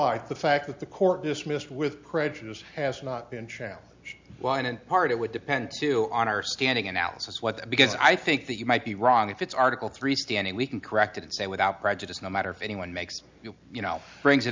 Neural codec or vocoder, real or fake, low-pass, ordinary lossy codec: none; real; 7.2 kHz; MP3, 64 kbps